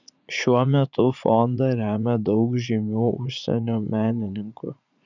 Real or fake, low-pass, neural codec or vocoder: fake; 7.2 kHz; vocoder, 44.1 kHz, 80 mel bands, Vocos